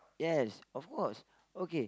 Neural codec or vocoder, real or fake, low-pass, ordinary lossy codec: none; real; none; none